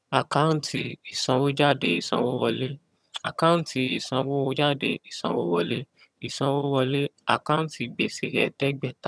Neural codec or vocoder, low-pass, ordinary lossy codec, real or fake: vocoder, 22.05 kHz, 80 mel bands, HiFi-GAN; none; none; fake